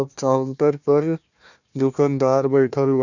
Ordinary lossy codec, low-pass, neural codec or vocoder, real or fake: none; 7.2 kHz; codec, 16 kHz, 1 kbps, FunCodec, trained on Chinese and English, 50 frames a second; fake